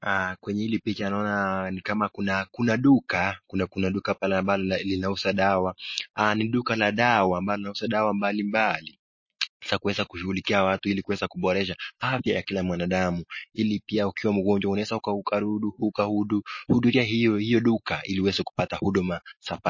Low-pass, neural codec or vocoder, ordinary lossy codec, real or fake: 7.2 kHz; none; MP3, 32 kbps; real